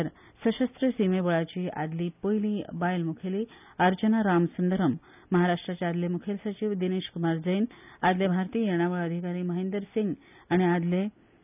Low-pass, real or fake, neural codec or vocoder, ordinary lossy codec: 3.6 kHz; real; none; none